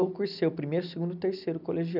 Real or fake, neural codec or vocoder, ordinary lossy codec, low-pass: real; none; none; 5.4 kHz